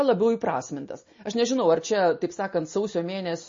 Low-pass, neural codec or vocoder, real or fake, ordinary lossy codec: 7.2 kHz; none; real; MP3, 32 kbps